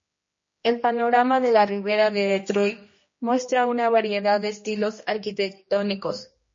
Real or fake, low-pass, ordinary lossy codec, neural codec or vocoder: fake; 7.2 kHz; MP3, 32 kbps; codec, 16 kHz, 1 kbps, X-Codec, HuBERT features, trained on general audio